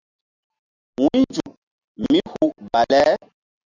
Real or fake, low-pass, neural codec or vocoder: real; 7.2 kHz; none